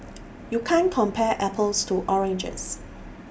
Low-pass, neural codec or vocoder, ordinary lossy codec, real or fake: none; none; none; real